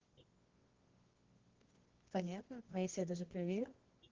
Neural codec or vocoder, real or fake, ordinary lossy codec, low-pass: codec, 24 kHz, 0.9 kbps, WavTokenizer, medium music audio release; fake; Opus, 16 kbps; 7.2 kHz